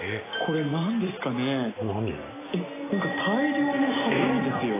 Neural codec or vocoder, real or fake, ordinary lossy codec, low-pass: none; real; AAC, 16 kbps; 3.6 kHz